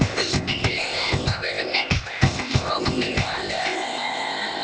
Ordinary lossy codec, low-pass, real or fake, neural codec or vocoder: none; none; fake; codec, 16 kHz, 0.8 kbps, ZipCodec